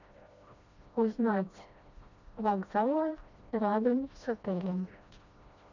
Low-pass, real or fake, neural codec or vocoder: 7.2 kHz; fake; codec, 16 kHz, 1 kbps, FreqCodec, smaller model